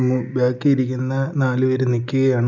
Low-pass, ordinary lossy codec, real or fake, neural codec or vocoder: 7.2 kHz; none; real; none